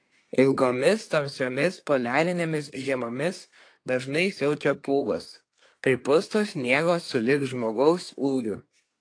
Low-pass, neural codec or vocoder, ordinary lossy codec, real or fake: 9.9 kHz; codec, 24 kHz, 1 kbps, SNAC; AAC, 48 kbps; fake